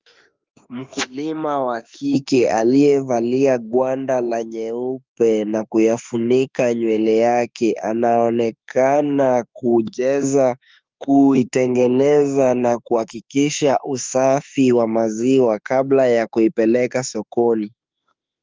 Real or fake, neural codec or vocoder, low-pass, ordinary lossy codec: fake; autoencoder, 48 kHz, 32 numbers a frame, DAC-VAE, trained on Japanese speech; 7.2 kHz; Opus, 24 kbps